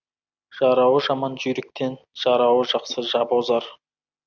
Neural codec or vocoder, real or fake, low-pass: none; real; 7.2 kHz